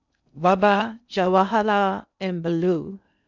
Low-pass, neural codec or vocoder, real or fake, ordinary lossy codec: 7.2 kHz; codec, 16 kHz in and 24 kHz out, 0.6 kbps, FocalCodec, streaming, 2048 codes; fake; none